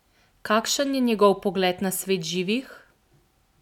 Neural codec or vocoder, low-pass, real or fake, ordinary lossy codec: none; 19.8 kHz; real; none